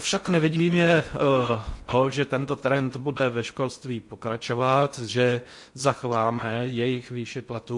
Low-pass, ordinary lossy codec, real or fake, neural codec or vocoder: 10.8 kHz; MP3, 48 kbps; fake; codec, 16 kHz in and 24 kHz out, 0.6 kbps, FocalCodec, streaming, 4096 codes